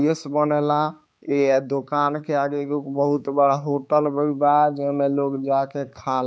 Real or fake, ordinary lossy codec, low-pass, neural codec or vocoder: fake; none; none; codec, 16 kHz, 4 kbps, X-Codec, HuBERT features, trained on balanced general audio